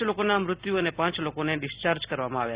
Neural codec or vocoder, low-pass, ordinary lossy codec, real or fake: none; 3.6 kHz; Opus, 64 kbps; real